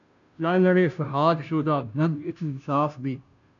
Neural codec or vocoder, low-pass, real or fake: codec, 16 kHz, 0.5 kbps, FunCodec, trained on Chinese and English, 25 frames a second; 7.2 kHz; fake